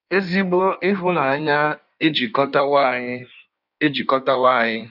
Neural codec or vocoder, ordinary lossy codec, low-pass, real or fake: codec, 16 kHz in and 24 kHz out, 1.1 kbps, FireRedTTS-2 codec; none; 5.4 kHz; fake